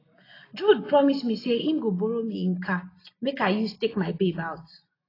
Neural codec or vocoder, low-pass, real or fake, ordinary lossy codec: none; 5.4 kHz; real; AAC, 24 kbps